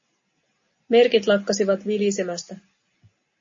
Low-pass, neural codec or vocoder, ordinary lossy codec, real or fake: 7.2 kHz; none; MP3, 32 kbps; real